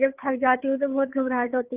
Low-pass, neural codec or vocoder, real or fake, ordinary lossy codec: 3.6 kHz; codec, 16 kHz, 8 kbps, FreqCodec, larger model; fake; Opus, 32 kbps